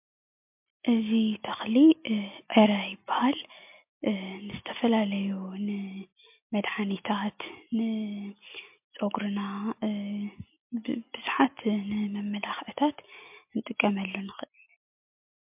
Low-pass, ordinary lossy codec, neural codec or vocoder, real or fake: 3.6 kHz; MP3, 32 kbps; none; real